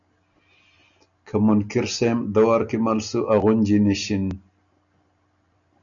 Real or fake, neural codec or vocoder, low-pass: real; none; 7.2 kHz